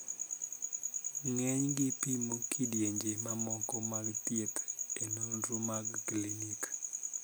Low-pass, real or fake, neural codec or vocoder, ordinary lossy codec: none; real; none; none